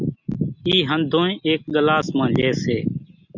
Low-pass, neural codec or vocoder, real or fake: 7.2 kHz; none; real